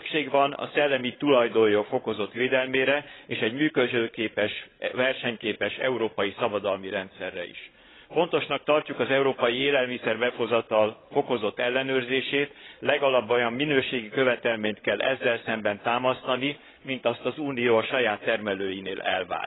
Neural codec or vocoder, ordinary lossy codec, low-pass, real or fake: codec, 16 kHz, 16 kbps, FreqCodec, larger model; AAC, 16 kbps; 7.2 kHz; fake